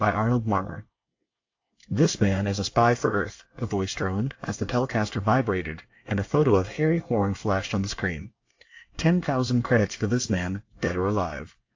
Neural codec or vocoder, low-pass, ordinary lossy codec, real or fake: codec, 24 kHz, 1 kbps, SNAC; 7.2 kHz; AAC, 48 kbps; fake